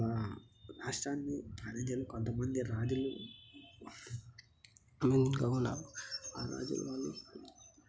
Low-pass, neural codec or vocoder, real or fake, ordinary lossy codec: none; none; real; none